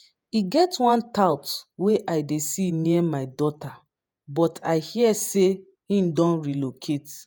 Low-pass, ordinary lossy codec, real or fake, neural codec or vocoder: none; none; fake; vocoder, 48 kHz, 128 mel bands, Vocos